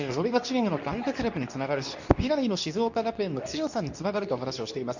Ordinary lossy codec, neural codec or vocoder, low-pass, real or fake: none; codec, 24 kHz, 0.9 kbps, WavTokenizer, medium speech release version 2; 7.2 kHz; fake